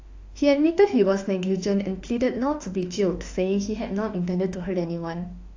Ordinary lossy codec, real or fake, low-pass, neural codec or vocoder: AAC, 48 kbps; fake; 7.2 kHz; autoencoder, 48 kHz, 32 numbers a frame, DAC-VAE, trained on Japanese speech